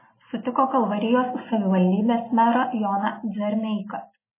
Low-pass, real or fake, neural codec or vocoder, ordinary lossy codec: 3.6 kHz; real; none; MP3, 16 kbps